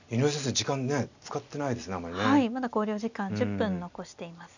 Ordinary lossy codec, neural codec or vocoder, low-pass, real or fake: none; none; 7.2 kHz; real